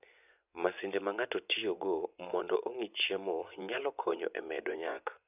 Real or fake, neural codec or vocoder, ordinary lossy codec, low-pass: fake; vocoder, 24 kHz, 100 mel bands, Vocos; none; 3.6 kHz